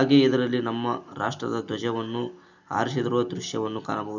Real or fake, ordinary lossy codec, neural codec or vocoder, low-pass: real; none; none; 7.2 kHz